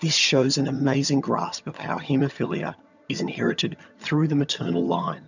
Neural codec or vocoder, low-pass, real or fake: vocoder, 22.05 kHz, 80 mel bands, HiFi-GAN; 7.2 kHz; fake